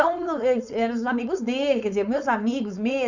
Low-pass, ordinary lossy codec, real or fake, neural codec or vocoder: 7.2 kHz; none; fake; codec, 16 kHz, 4.8 kbps, FACodec